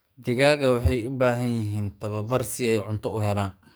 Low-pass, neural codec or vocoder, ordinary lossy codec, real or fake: none; codec, 44.1 kHz, 2.6 kbps, SNAC; none; fake